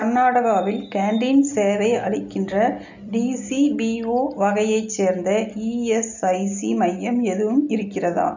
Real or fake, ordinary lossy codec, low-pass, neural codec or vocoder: real; none; 7.2 kHz; none